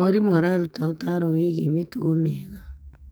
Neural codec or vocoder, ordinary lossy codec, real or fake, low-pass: codec, 44.1 kHz, 2.6 kbps, SNAC; none; fake; none